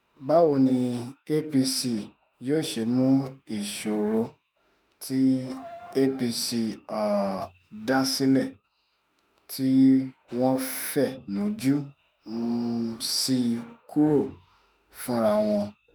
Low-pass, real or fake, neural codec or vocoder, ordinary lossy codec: none; fake; autoencoder, 48 kHz, 32 numbers a frame, DAC-VAE, trained on Japanese speech; none